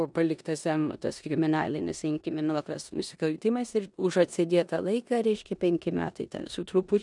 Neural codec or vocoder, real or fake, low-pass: codec, 16 kHz in and 24 kHz out, 0.9 kbps, LongCat-Audio-Codec, four codebook decoder; fake; 10.8 kHz